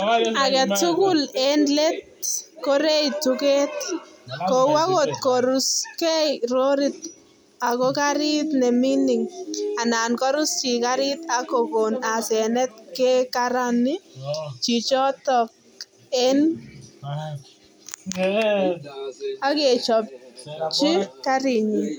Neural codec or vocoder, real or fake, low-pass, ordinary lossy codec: none; real; none; none